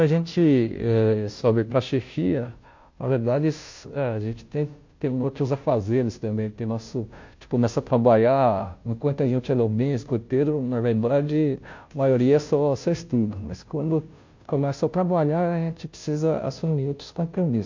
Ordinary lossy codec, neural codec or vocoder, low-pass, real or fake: MP3, 48 kbps; codec, 16 kHz, 0.5 kbps, FunCodec, trained on Chinese and English, 25 frames a second; 7.2 kHz; fake